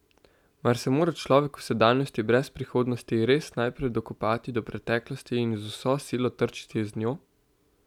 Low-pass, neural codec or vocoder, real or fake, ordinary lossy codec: 19.8 kHz; none; real; none